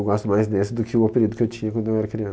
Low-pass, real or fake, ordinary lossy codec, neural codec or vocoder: none; real; none; none